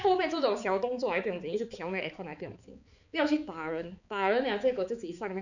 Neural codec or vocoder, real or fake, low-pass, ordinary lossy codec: codec, 16 kHz, 4 kbps, X-Codec, HuBERT features, trained on balanced general audio; fake; 7.2 kHz; none